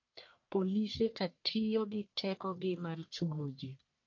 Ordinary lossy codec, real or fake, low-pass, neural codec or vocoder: MP3, 48 kbps; fake; 7.2 kHz; codec, 44.1 kHz, 1.7 kbps, Pupu-Codec